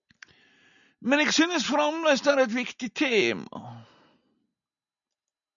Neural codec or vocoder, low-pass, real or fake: none; 7.2 kHz; real